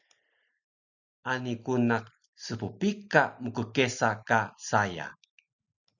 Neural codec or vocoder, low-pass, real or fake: none; 7.2 kHz; real